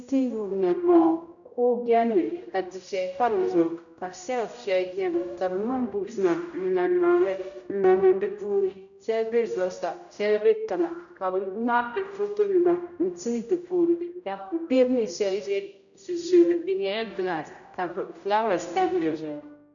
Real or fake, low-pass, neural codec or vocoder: fake; 7.2 kHz; codec, 16 kHz, 0.5 kbps, X-Codec, HuBERT features, trained on general audio